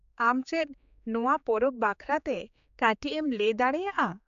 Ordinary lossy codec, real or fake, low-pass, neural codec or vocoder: AAC, 96 kbps; fake; 7.2 kHz; codec, 16 kHz, 4 kbps, X-Codec, HuBERT features, trained on general audio